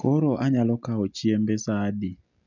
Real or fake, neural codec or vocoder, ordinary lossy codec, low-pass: real; none; none; 7.2 kHz